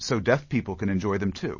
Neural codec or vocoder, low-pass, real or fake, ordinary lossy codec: none; 7.2 kHz; real; MP3, 32 kbps